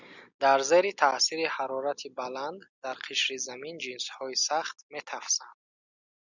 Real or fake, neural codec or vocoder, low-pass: real; none; 7.2 kHz